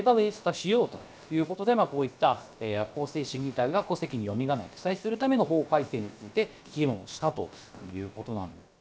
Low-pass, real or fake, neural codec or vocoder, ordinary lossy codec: none; fake; codec, 16 kHz, about 1 kbps, DyCAST, with the encoder's durations; none